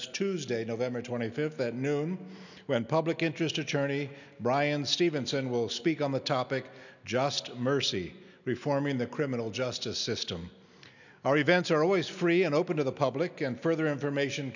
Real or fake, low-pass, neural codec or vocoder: real; 7.2 kHz; none